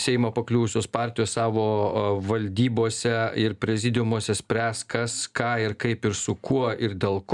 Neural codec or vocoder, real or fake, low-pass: none; real; 10.8 kHz